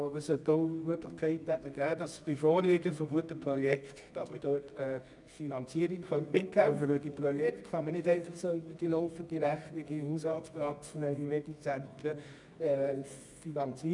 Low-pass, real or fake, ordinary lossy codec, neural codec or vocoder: 10.8 kHz; fake; none; codec, 24 kHz, 0.9 kbps, WavTokenizer, medium music audio release